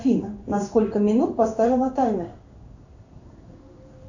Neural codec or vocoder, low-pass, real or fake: codec, 16 kHz in and 24 kHz out, 1 kbps, XY-Tokenizer; 7.2 kHz; fake